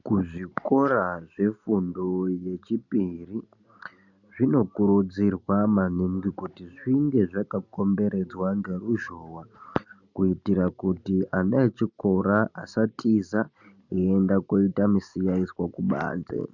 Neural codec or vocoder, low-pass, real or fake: none; 7.2 kHz; real